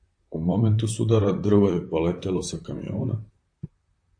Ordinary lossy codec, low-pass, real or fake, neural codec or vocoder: AAC, 64 kbps; 9.9 kHz; fake; vocoder, 44.1 kHz, 128 mel bands, Pupu-Vocoder